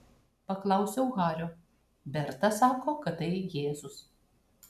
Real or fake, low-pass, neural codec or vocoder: real; 14.4 kHz; none